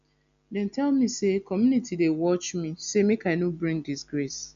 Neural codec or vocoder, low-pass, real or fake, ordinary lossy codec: none; 7.2 kHz; real; none